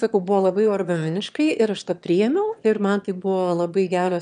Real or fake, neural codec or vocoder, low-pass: fake; autoencoder, 22.05 kHz, a latent of 192 numbers a frame, VITS, trained on one speaker; 9.9 kHz